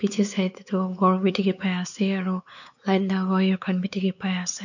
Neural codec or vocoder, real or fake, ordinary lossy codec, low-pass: codec, 16 kHz, 4 kbps, X-Codec, WavLM features, trained on Multilingual LibriSpeech; fake; none; 7.2 kHz